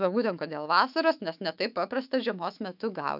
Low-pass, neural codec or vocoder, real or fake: 5.4 kHz; autoencoder, 48 kHz, 128 numbers a frame, DAC-VAE, trained on Japanese speech; fake